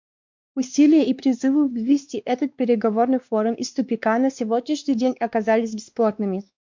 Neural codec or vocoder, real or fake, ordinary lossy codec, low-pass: codec, 16 kHz, 2 kbps, X-Codec, WavLM features, trained on Multilingual LibriSpeech; fake; MP3, 64 kbps; 7.2 kHz